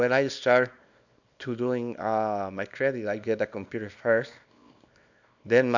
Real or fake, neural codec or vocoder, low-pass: fake; codec, 24 kHz, 0.9 kbps, WavTokenizer, small release; 7.2 kHz